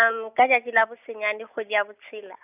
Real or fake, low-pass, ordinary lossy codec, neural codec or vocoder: real; 3.6 kHz; none; none